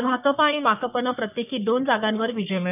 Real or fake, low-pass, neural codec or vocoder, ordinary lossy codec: fake; 3.6 kHz; codec, 44.1 kHz, 3.4 kbps, Pupu-Codec; none